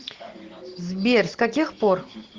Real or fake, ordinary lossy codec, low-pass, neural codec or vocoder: real; Opus, 16 kbps; 7.2 kHz; none